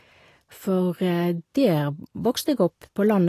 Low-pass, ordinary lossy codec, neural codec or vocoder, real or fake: 14.4 kHz; AAC, 48 kbps; none; real